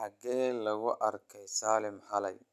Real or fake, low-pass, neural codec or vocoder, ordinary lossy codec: real; 14.4 kHz; none; none